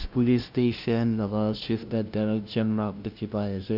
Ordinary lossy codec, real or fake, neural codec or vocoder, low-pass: none; fake; codec, 16 kHz, 0.5 kbps, FunCodec, trained on LibriTTS, 25 frames a second; 5.4 kHz